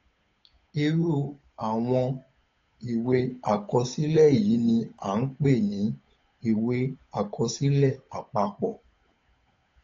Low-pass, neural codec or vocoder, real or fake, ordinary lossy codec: 7.2 kHz; codec, 16 kHz, 8 kbps, FunCodec, trained on Chinese and English, 25 frames a second; fake; AAC, 32 kbps